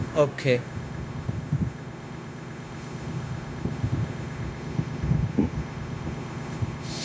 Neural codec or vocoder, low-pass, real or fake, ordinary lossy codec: codec, 16 kHz, 0.9 kbps, LongCat-Audio-Codec; none; fake; none